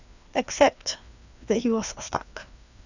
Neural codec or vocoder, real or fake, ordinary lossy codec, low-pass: codec, 16 kHz, 2 kbps, FreqCodec, larger model; fake; none; 7.2 kHz